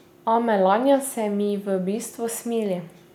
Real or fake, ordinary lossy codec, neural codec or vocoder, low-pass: real; none; none; 19.8 kHz